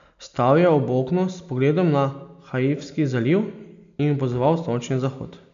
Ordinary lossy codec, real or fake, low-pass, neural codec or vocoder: MP3, 64 kbps; real; 7.2 kHz; none